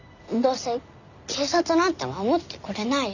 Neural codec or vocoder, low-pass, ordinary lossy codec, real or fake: none; 7.2 kHz; none; real